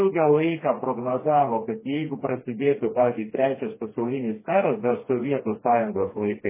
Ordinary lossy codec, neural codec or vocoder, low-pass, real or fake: MP3, 16 kbps; codec, 16 kHz, 2 kbps, FreqCodec, smaller model; 3.6 kHz; fake